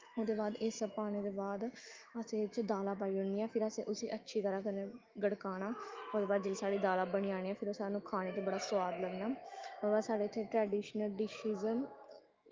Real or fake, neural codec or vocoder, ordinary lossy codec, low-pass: fake; autoencoder, 48 kHz, 128 numbers a frame, DAC-VAE, trained on Japanese speech; Opus, 32 kbps; 7.2 kHz